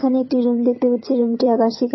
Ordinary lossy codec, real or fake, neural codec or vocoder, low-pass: MP3, 24 kbps; fake; vocoder, 22.05 kHz, 80 mel bands, HiFi-GAN; 7.2 kHz